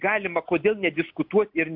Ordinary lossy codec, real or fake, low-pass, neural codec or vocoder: MP3, 48 kbps; real; 5.4 kHz; none